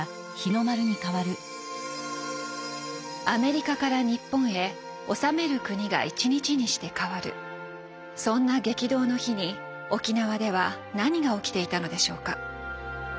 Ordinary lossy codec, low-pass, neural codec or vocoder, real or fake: none; none; none; real